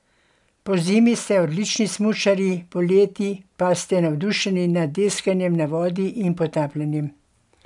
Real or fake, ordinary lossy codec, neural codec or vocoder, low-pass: real; none; none; 10.8 kHz